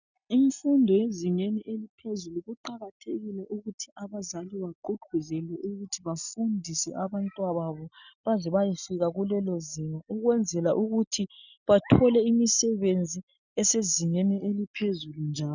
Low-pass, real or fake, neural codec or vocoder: 7.2 kHz; real; none